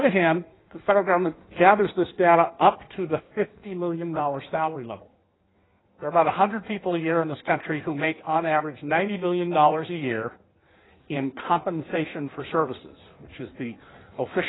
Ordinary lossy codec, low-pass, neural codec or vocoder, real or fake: AAC, 16 kbps; 7.2 kHz; codec, 16 kHz in and 24 kHz out, 1.1 kbps, FireRedTTS-2 codec; fake